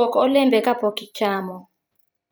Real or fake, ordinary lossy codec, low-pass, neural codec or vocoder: fake; none; none; vocoder, 44.1 kHz, 128 mel bands every 256 samples, BigVGAN v2